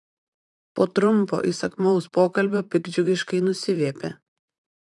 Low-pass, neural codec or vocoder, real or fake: 10.8 kHz; vocoder, 44.1 kHz, 128 mel bands, Pupu-Vocoder; fake